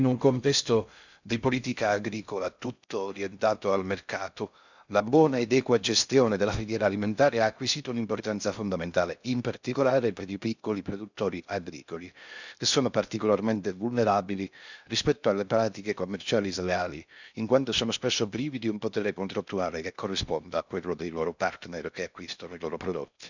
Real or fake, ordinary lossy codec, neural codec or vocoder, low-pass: fake; none; codec, 16 kHz in and 24 kHz out, 0.6 kbps, FocalCodec, streaming, 2048 codes; 7.2 kHz